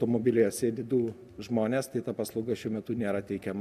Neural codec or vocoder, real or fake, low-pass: none; real; 14.4 kHz